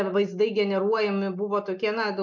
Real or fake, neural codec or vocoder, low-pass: real; none; 7.2 kHz